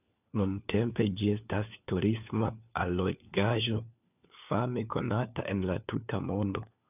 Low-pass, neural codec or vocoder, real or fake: 3.6 kHz; codec, 16 kHz, 4 kbps, FunCodec, trained on LibriTTS, 50 frames a second; fake